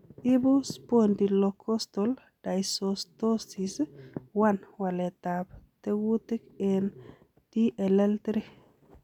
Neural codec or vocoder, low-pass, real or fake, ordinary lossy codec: none; 19.8 kHz; real; none